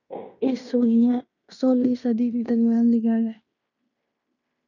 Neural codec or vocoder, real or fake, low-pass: codec, 16 kHz in and 24 kHz out, 0.9 kbps, LongCat-Audio-Codec, fine tuned four codebook decoder; fake; 7.2 kHz